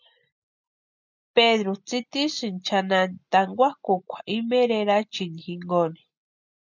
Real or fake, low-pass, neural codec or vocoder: real; 7.2 kHz; none